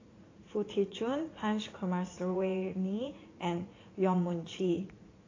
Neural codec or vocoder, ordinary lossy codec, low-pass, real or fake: vocoder, 44.1 kHz, 80 mel bands, Vocos; AAC, 32 kbps; 7.2 kHz; fake